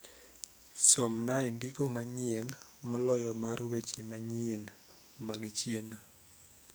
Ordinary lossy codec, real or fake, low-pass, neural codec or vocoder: none; fake; none; codec, 44.1 kHz, 2.6 kbps, SNAC